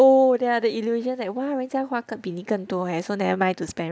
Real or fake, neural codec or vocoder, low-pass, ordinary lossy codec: real; none; none; none